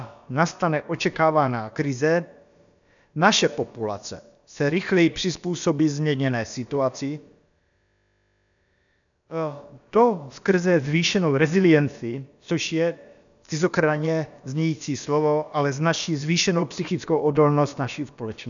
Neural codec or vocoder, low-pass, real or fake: codec, 16 kHz, about 1 kbps, DyCAST, with the encoder's durations; 7.2 kHz; fake